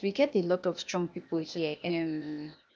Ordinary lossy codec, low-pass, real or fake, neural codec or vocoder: none; none; fake; codec, 16 kHz, 0.8 kbps, ZipCodec